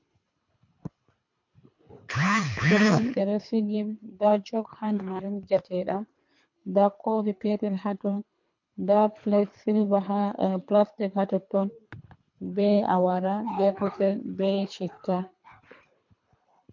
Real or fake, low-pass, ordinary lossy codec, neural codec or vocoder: fake; 7.2 kHz; MP3, 48 kbps; codec, 24 kHz, 3 kbps, HILCodec